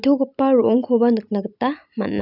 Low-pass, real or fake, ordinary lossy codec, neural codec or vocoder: 5.4 kHz; real; none; none